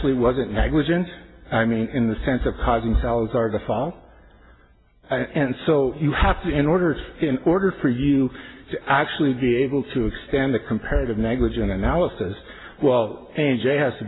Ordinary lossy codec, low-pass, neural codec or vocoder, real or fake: AAC, 16 kbps; 7.2 kHz; none; real